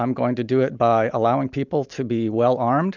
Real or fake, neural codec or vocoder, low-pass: real; none; 7.2 kHz